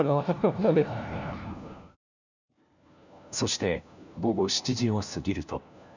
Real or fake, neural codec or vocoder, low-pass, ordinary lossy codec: fake; codec, 16 kHz, 1 kbps, FunCodec, trained on LibriTTS, 50 frames a second; 7.2 kHz; none